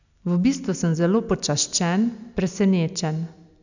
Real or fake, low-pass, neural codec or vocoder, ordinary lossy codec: real; 7.2 kHz; none; none